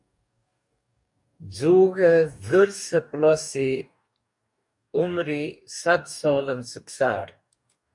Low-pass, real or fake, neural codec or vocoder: 10.8 kHz; fake; codec, 44.1 kHz, 2.6 kbps, DAC